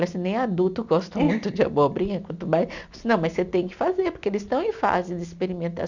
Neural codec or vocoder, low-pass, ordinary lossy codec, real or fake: none; 7.2 kHz; none; real